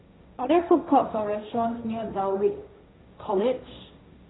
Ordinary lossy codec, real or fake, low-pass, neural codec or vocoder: AAC, 16 kbps; fake; 7.2 kHz; codec, 16 kHz, 1.1 kbps, Voila-Tokenizer